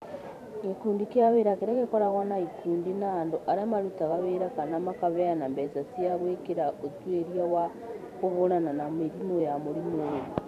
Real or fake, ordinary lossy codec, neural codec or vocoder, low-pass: fake; none; vocoder, 44.1 kHz, 128 mel bands every 512 samples, BigVGAN v2; 14.4 kHz